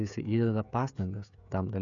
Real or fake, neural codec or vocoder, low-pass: fake; codec, 16 kHz, 16 kbps, FreqCodec, smaller model; 7.2 kHz